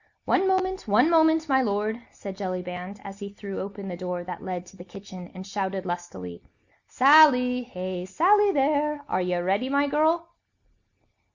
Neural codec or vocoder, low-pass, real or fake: none; 7.2 kHz; real